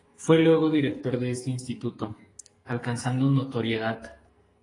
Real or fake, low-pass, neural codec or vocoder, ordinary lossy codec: fake; 10.8 kHz; codec, 44.1 kHz, 2.6 kbps, SNAC; AAC, 32 kbps